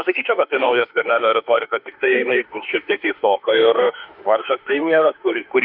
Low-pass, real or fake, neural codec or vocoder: 5.4 kHz; fake; autoencoder, 48 kHz, 32 numbers a frame, DAC-VAE, trained on Japanese speech